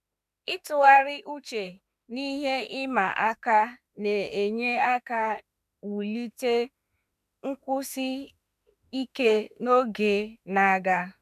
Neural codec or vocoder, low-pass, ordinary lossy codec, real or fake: autoencoder, 48 kHz, 32 numbers a frame, DAC-VAE, trained on Japanese speech; 14.4 kHz; none; fake